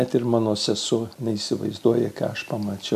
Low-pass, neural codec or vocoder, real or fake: 14.4 kHz; none; real